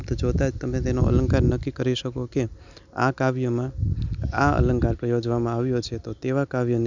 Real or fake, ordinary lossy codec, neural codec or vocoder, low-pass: real; none; none; 7.2 kHz